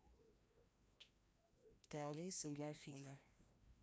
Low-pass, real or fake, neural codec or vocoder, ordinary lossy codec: none; fake; codec, 16 kHz, 1 kbps, FreqCodec, larger model; none